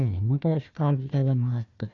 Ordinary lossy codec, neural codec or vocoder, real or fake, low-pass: MP3, 64 kbps; codec, 16 kHz, 1 kbps, FunCodec, trained on Chinese and English, 50 frames a second; fake; 7.2 kHz